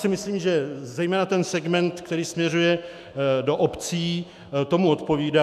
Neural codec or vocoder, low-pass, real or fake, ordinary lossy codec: autoencoder, 48 kHz, 128 numbers a frame, DAC-VAE, trained on Japanese speech; 14.4 kHz; fake; MP3, 96 kbps